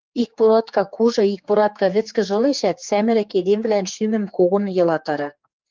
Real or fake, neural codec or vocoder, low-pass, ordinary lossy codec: fake; codec, 16 kHz, 4 kbps, X-Codec, HuBERT features, trained on general audio; 7.2 kHz; Opus, 32 kbps